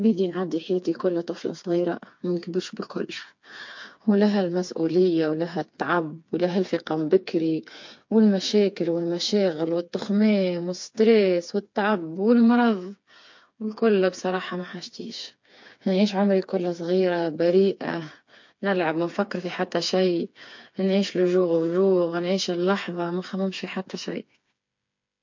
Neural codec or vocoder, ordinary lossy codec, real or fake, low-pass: codec, 16 kHz, 4 kbps, FreqCodec, smaller model; MP3, 48 kbps; fake; 7.2 kHz